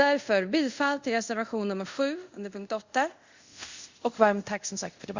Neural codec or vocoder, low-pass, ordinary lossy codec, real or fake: codec, 24 kHz, 0.5 kbps, DualCodec; 7.2 kHz; Opus, 64 kbps; fake